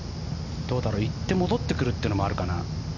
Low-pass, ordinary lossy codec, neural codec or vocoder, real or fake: 7.2 kHz; none; none; real